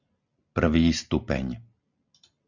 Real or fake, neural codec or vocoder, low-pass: real; none; 7.2 kHz